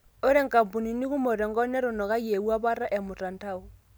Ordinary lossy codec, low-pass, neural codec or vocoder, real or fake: none; none; none; real